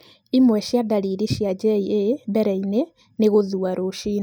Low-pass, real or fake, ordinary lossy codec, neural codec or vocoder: none; real; none; none